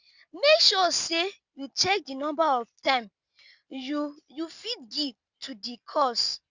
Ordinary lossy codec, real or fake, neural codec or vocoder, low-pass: Opus, 64 kbps; real; none; 7.2 kHz